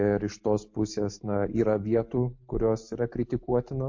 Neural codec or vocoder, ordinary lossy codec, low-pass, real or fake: none; MP3, 48 kbps; 7.2 kHz; real